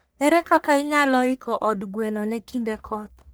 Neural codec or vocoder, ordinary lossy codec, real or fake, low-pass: codec, 44.1 kHz, 1.7 kbps, Pupu-Codec; none; fake; none